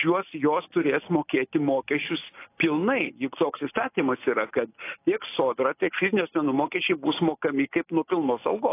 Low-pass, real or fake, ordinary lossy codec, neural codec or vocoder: 3.6 kHz; real; AAC, 24 kbps; none